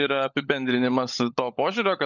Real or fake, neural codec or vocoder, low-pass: fake; codec, 16 kHz, 16 kbps, FunCodec, trained on LibriTTS, 50 frames a second; 7.2 kHz